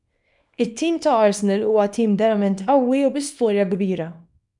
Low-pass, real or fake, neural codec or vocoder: 10.8 kHz; fake; codec, 24 kHz, 0.9 kbps, WavTokenizer, small release